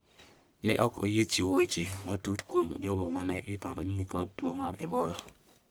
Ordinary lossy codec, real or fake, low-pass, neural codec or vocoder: none; fake; none; codec, 44.1 kHz, 1.7 kbps, Pupu-Codec